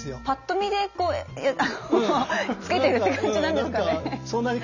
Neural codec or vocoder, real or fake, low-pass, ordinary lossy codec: none; real; 7.2 kHz; none